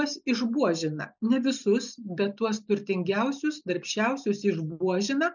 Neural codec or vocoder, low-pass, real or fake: none; 7.2 kHz; real